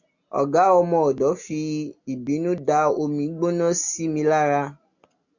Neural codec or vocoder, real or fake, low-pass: none; real; 7.2 kHz